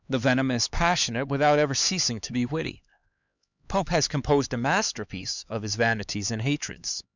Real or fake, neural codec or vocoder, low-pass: fake; codec, 16 kHz, 4 kbps, X-Codec, HuBERT features, trained on LibriSpeech; 7.2 kHz